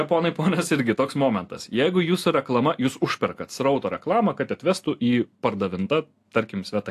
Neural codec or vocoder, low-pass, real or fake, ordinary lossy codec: none; 14.4 kHz; real; AAC, 64 kbps